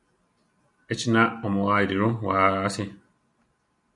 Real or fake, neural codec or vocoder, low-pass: real; none; 10.8 kHz